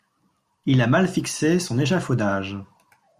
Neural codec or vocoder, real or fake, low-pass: none; real; 14.4 kHz